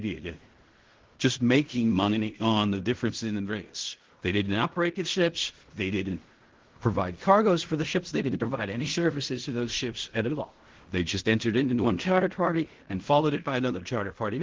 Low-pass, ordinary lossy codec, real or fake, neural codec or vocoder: 7.2 kHz; Opus, 16 kbps; fake; codec, 16 kHz in and 24 kHz out, 0.4 kbps, LongCat-Audio-Codec, fine tuned four codebook decoder